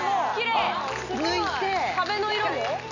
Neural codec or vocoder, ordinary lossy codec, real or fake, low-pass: none; none; real; 7.2 kHz